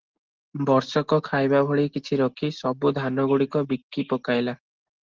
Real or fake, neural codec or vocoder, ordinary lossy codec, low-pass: real; none; Opus, 16 kbps; 7.2 kHz